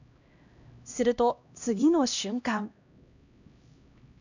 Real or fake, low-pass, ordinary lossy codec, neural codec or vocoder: fake; 7.2 kHz; none; codec, 16 kHz, 1 kbps, X-Codec, HuBERT features, trained on LibriSpeech